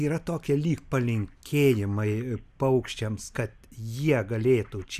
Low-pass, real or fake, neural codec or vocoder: 14.4 kHz; real; none